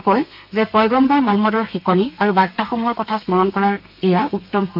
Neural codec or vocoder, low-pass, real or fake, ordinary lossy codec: codec, 32 kHz, 1.9 kbps, SNAC; 5.4 kHz; fake; MP3, 48 kbps